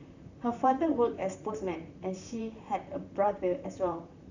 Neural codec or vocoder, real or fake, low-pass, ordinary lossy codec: vocoder, 44.1 kHz, 128 mel bands, Pupu-Vocoder; fake; 7.2 kHz; none